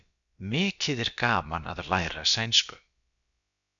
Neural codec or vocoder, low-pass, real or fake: codec, 16 kHz, about 1 kbps, DyCAST, with the encoder's durations; 7.2 kHz; fake